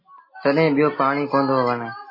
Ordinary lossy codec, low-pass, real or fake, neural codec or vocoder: MP3, 24 kbps; 5.4 kHz; real; none